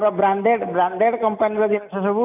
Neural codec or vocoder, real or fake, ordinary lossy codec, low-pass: none; real; none; 3.6 kHz